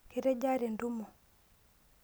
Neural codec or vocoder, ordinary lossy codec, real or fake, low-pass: none; none; real; none